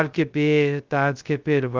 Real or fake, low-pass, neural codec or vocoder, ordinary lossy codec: fake; 7.2 kHz; codec, 16 kHz, 0.2 kbps, FocalCodec; Opus, 32 kbps